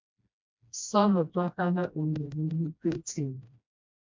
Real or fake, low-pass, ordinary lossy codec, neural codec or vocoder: fake; 7.2 kHz; MP3, 64 kbps; codec, 16 kHz, 1 kbps, FreqCodec, smaller model